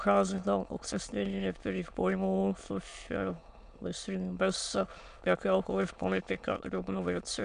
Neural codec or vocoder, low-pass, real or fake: autoencoder, 22.05 kHz, a latent of 192 numbers a frame, VITS, trained on many speakers; 9.9 kHz; fake